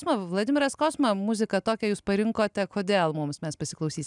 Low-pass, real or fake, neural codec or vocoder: 10.8 kHz; real; none